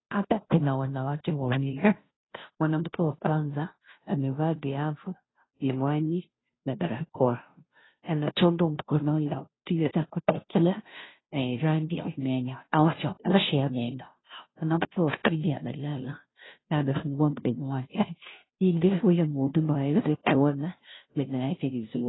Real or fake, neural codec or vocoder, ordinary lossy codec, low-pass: fake; codec, 16 kHz, 0.5 kbps, FunCodec, trained on Chinese and English, 25 frames a second; AAC, 16 kbps; 7.2 kHz